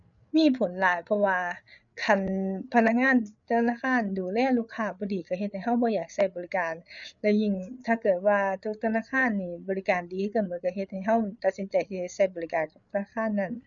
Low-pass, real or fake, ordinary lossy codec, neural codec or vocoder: 7.2 kHz; fake; none; codec, 16 kHz, 8 kbps, FreqCodec, larger model